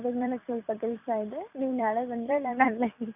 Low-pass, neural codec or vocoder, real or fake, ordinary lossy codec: 3.6 kHz; vocoder, 44.1 kHz, 128 mel bands every 256 samples, BigVGAN v2; fake; none